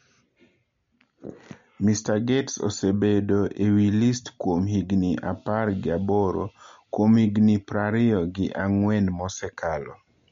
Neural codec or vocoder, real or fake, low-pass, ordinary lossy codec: none; real; 7.2 kHz; MP3, 48 kbps